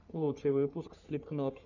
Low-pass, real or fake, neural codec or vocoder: 7.2 kHz; fake; codec, 44.1 kHz, 3.4 kbps, Pupu-Codec